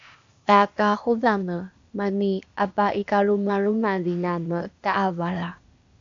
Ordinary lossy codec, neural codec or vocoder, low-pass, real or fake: AAC, 64 kbps; codec, 16 kHz, 0.8 kbps, ZipCodec; 7.2 kHz; fake